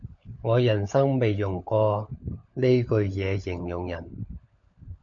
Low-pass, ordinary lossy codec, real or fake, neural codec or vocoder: 7.2 kHz; MP3, 64 kbps; fake; codec, 16 kHz, 16 kbps, FunCodec, trained on LibriTTS, 50 frames a second